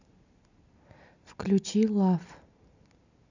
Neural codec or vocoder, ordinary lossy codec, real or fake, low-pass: none; none; real; 7.2 kHz